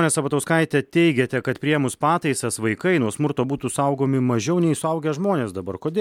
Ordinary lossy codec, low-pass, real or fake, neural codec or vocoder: MP3, 96 kbps; 19.8 kHz; real; none